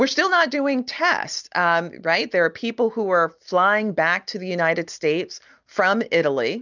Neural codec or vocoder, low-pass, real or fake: none; 7.2 kHz; real